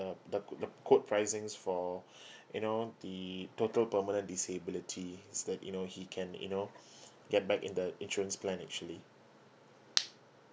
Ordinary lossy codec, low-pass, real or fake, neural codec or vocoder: none; none; real; none